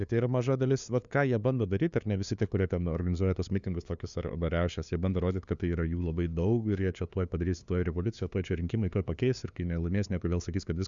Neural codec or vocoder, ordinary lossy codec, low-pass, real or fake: codec, 16 kHz, 2 kbps, FunCodec, trained on LibriTTS, 25 frames a second; Opus, 64 kbps; 7.2 kHz; fake